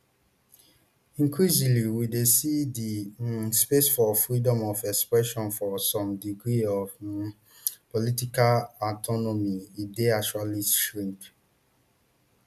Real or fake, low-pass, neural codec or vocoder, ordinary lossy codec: real; 14.4 kHz; none; none